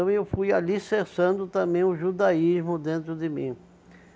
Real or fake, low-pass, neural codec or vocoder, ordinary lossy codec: real; none; none; none